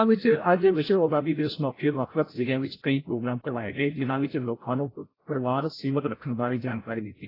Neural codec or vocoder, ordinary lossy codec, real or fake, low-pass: codec, 16 kHz, 0.5 kbps, FreqCodec, larger model; AAC, 24 kbps; fake; 5.4 kHz